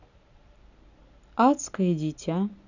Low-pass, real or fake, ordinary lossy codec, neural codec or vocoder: 7.2 kHz; real; none; none